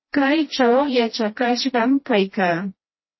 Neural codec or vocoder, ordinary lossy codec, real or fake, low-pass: codec, 16 kHz, 1 kbps, FreqCodec, smaller model; MP3, 24 kbps; fake; 7.2 kHz